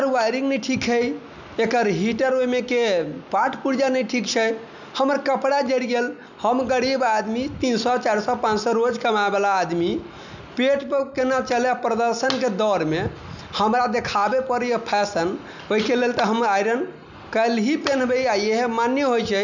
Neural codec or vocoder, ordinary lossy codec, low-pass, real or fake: none; none; 7.2 kHz; real